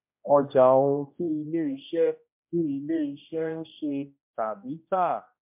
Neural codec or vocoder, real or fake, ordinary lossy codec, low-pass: codec, 16 kHz, 1 kbps, X-Codec, HuBERT features, trained on general audio; fake; MP3, 32 kbps; 3.6 kHz